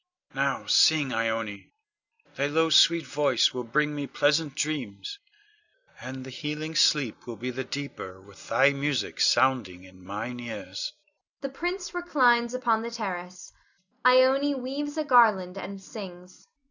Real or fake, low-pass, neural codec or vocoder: real; 7.2 kHz; none